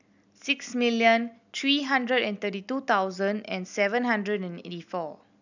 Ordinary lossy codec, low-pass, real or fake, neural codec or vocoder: none; 7.2 kHz; real; none